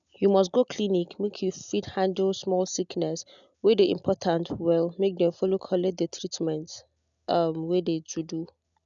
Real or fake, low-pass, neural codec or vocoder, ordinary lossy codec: real; 7.2 kHz; none; none